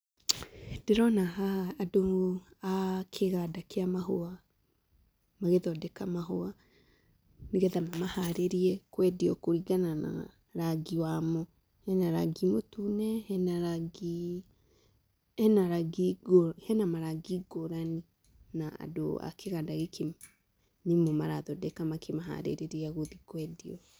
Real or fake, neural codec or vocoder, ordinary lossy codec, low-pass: real; none; none; none